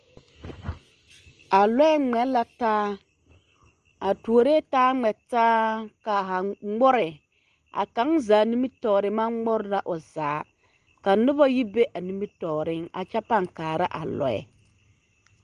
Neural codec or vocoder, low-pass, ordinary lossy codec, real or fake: none; 9.9 kHz; Opus, 24 kbps; real